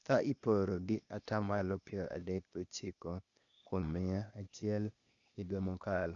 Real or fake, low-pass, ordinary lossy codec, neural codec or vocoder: fake; 7.2 kHz; none; codec, 16 kHz, 0.8 kbps, ZipCodec